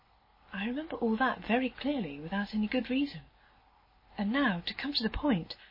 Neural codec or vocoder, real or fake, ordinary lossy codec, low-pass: none; real; MP3, 24 kbps; 5.4 kHz